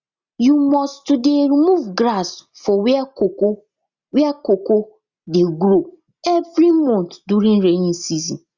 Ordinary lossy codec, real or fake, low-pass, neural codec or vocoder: Opus, 64 kbps; real; 7.2 kHz; none